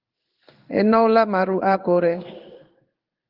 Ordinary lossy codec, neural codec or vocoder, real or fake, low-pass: Opus, 32 kbps; codec, 16 kHz in and 24 kHz out, 1 kbps, XY-Tokenizer; fake; 5.4 kHz